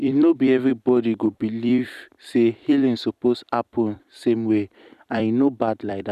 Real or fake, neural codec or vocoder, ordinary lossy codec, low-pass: fake; vocoder, 44.1 kHz, 128 mel bands every 256 samples, BigVGAN v2; none; 14.4 kHz